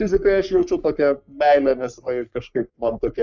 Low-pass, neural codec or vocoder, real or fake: 7.2 kHz; codec, 44.1 kHz, 3.4 kbps, Pupu-Codec; fake